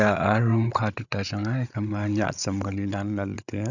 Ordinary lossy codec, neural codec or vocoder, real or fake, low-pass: none; codec, 16 kHz, 8 kbps, FreqCodec, larger model; fake; 7.2 kHz